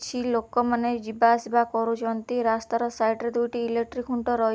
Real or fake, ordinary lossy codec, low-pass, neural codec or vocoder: real; none; none; none